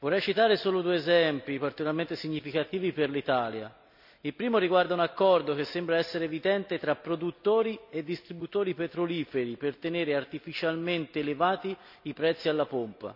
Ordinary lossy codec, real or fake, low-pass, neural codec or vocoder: none; real; 5.4 kHz; none